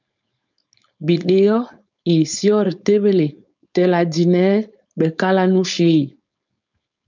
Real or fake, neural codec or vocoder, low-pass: fake; codec, 16 kHz, 4.8 kbps, FACodec; 7.2 kHz